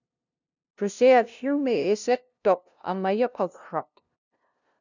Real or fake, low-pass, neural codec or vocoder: fake; 7.2 kHz; codec, 16 kHz, 0.5 kbps, FunCodec, trained on LibriTTS, 25 frames a second